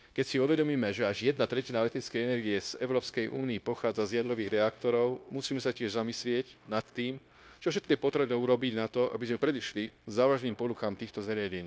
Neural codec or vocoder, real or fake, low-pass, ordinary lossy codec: codec, 16 kHz, 0.9 kbps, LongCat-Audio-Codec; fake; none; none